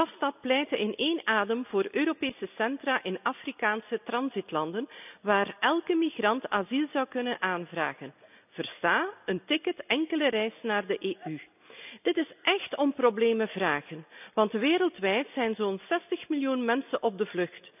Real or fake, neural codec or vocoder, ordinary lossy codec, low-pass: real; none; none; 3.6 kHz